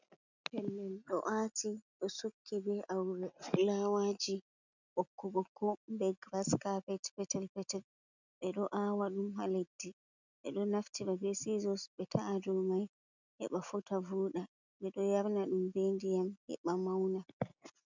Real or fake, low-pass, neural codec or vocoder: real; 7.2 kHz; none